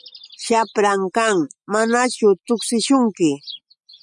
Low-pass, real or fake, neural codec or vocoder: 10.8 kHz; real; none